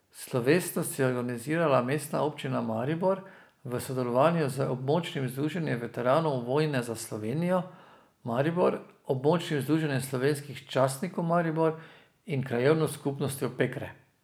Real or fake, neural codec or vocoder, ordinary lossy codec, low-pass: real; none; none; none